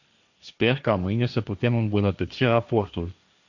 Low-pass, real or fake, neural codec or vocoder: 7.2 kHz; fake; codec, 16 kHz, 1.1 kbps, Voila-Tokenizer